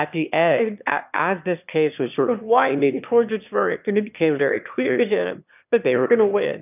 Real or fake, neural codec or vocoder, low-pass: fake; autoencoder, 22.05 kHz, a latent of 192 numbers a frame, VITS, trained on one speaker; 3.6 kHz